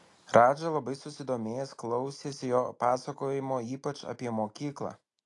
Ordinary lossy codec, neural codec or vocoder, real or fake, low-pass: AAC, 48 kbps; none; real; 10.8 kHz